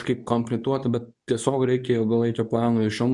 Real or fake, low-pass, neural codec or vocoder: fake; 10.8 kHz; codec, 24 kHz, 0.9 kbps, WavTokenizer, medium speech release version 2